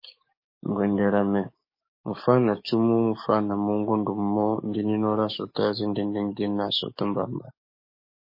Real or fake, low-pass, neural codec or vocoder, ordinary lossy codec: fake; 5.4 kHz; codec, 44.1 kHz, 7.8 kbps, DAC; MP3, 24 kbps